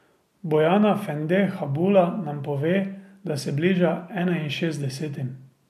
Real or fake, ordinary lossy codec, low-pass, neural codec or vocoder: real; MP3, 96 kbps; 14.4 kHz; none